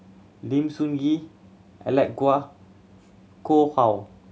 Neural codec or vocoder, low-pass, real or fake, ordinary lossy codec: none; none; real; none